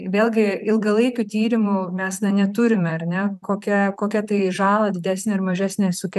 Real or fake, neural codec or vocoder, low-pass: fake; vocoder, 44.1 kHz, 128 mel bands, Pupu-Vocoder; 14.4 kHz